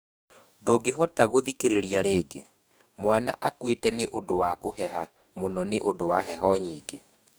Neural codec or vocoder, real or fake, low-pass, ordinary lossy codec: codec, 44.1 kHz, 2.6 kbps, DAC; fake; none; none